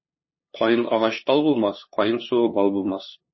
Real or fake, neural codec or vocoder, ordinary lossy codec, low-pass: fake; codec, 16 kHz, 2 kbps, FunCodec, trained on LibriTTS, 25 frames a second; MP3, 24 kbps; 7.2 kHz